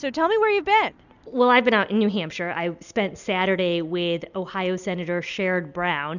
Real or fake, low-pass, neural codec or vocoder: real; 7.2 kHz; none